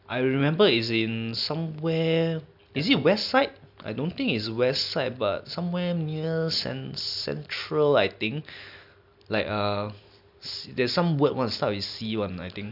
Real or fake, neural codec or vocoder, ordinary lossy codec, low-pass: real; none; none; 5.4 kHz